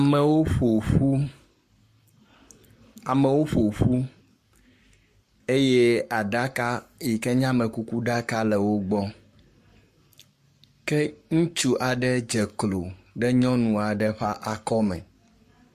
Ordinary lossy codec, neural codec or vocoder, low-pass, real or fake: MP3, 64 kbps; codec, 44.1 kHz, 7.8 kbps, Pupu-Codec; 14.4 kHz; fake